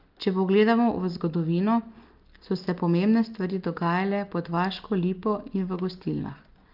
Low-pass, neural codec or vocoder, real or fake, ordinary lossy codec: 5.4 kHz; none; real; Opus, 32 kbps